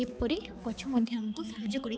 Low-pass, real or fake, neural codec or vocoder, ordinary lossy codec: none; fake; codec, 16 kHz, 4 kbps, X-Codec, HuBERT features, trained on balanced general audio; none